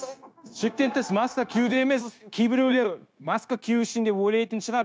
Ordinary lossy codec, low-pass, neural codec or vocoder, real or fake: none; none; codec, 16 kHz, 0.9 kbps, LongCat-Audio-Codec; fake